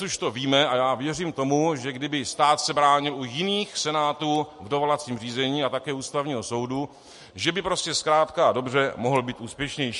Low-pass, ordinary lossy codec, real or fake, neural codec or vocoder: 14.4 kHz; MP3, 48 kbps; real; none